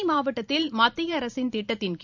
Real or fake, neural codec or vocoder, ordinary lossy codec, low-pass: fake; vocoder, 44.1 kHz, 128 mel bands every 512 samples, BigVGAN v2; none; 7.2 kHz